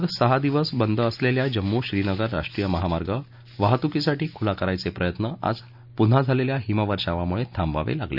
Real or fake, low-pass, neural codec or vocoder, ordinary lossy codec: real; 5.4 kHz; none; none